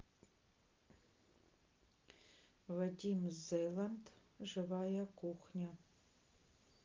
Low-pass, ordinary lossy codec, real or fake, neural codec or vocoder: 7.2 kHz; Opus, 24 kbps; real; none